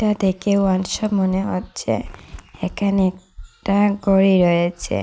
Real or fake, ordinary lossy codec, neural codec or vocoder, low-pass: real; none; none; none